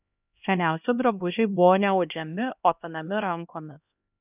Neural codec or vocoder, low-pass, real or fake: codec, 16 kHz, 1 kbps, X-Codec, HuBERT features, trained on LibriSpeech; 3.6 kHz; fake